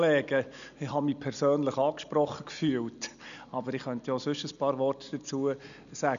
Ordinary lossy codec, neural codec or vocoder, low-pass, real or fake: none; none; 7.2 kHz; real